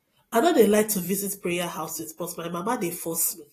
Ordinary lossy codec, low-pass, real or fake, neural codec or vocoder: AAC, 48 kbps; 14.4 kHz; real; none